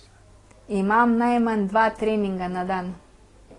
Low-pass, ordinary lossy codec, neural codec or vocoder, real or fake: 10.8 kHz; AAC, 32 kbps; none; real